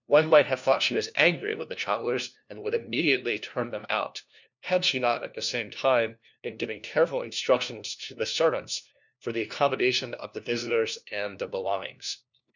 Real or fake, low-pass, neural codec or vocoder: fake; 7.2 kHz; codec, 16 kHz, 1 kbps, FunCodec, trained on LibriTTS, 50 frames a second